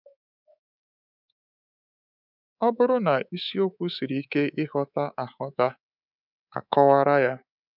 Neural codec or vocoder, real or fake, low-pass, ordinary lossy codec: codec, 24 kHz, 3.1 kbps, DualCodec; fake; 5.4 kHz; none